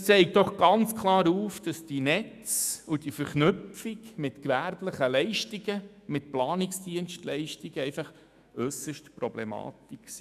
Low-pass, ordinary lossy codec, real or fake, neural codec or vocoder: 14.4 kHz; none; fake; autoencoder, 48 kHz, 128 numbers a frame, DAC-VAE, trained on Japanese speech